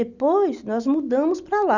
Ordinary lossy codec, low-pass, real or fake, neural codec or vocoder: none; 7.2 kHz; real; none